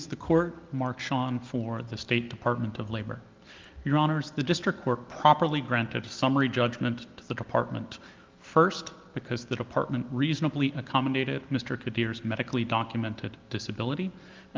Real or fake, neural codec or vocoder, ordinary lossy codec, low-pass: real; none; Opus, 32 kbps; 7.2 kHz